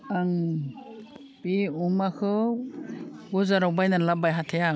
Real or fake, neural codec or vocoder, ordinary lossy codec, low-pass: real; none; none; none